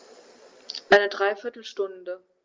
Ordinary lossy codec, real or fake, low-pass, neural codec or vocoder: Opus, 24 kbps; real; 7.2 kHz; none